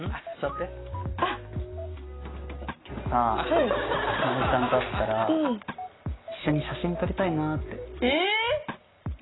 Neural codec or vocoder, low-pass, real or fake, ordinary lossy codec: none; 7.2 kHz; real; AAC, 16 kbps